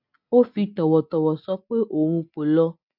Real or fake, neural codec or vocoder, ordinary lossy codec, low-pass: real; none; AAC, 48 kbps; 5.4 kHz